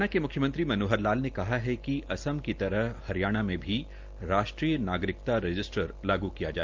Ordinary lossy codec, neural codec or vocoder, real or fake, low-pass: Opus, 32 kbps; none; real; 7.2 kHz